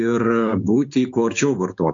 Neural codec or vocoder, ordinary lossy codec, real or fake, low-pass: codec, 16 kHz, 6 kbps, DAC; AAC, 64 kbps; fake; 7.2 kHz